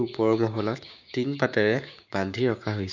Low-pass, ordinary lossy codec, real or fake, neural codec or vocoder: 7.2 kHz; none; fake; codec, 16 kHz, 6 kbps, DAC